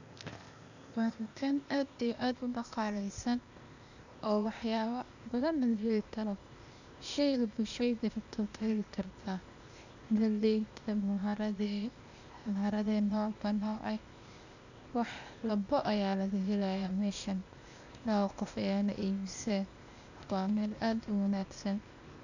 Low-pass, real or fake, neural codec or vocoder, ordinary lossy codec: 7.2 kHz; fake; codec, 16 kHz, 0.8 kbps, ZipCodec; none